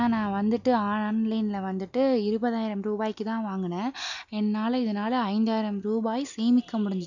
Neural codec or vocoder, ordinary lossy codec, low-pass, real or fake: none; none; 7.2 kHz; real